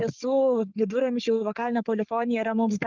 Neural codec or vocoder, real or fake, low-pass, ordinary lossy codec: codec, 44.1 kHz, 3.4 kbps, Pupu-Codec; fake; 7.2 kHz; Opus, 32 kbps